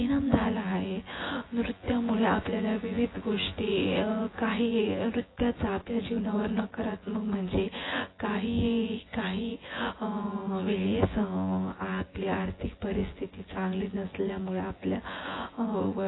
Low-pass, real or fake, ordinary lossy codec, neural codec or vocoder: 7.2 kHz; fake; AAC, 16 kbps; vocoder, 24 kHz, 100 mel bands, Vocos